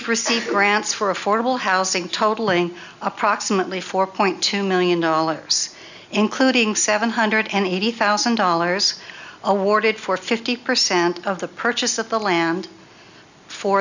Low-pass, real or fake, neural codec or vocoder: 7.2 kHz; real; none